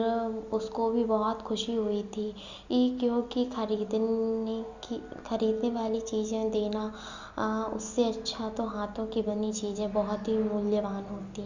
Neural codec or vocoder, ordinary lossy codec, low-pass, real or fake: none; Opus, 64 kbps; 7.2 kHz; real